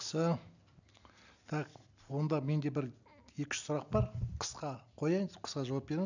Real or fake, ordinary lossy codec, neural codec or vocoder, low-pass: real; none; none; 7.2 kHz